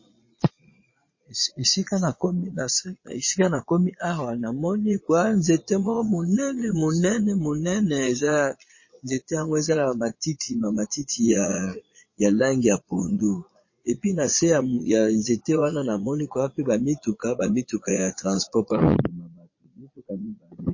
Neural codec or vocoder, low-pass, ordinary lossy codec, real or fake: vocoder, 22.05 kHz, 80 mel bands, Vocos; 7.2 kHz; MP3, 32 kbps; fake